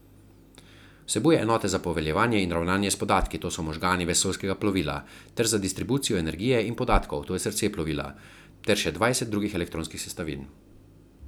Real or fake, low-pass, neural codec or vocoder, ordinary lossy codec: real; none; none; none